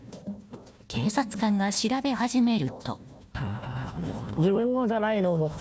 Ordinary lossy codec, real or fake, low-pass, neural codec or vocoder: none; fake; none; codec, 16 kHz, 1 kbps, FunCodec, trained on Chinese and English, 50 frames a second